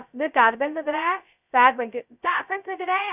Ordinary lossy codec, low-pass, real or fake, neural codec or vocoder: none; 3.6 kHz; fake; codec, 16 kHz, 0.2 kbps, FocalCodec